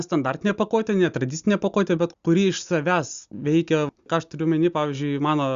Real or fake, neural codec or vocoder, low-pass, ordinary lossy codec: real; none; 7.2 kHz; Opus, 64 kbps